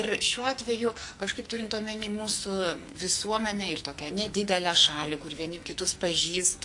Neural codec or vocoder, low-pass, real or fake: codec, 44.1 kHz, 2.6 kbps, SNAC; 10.8 kHz; fake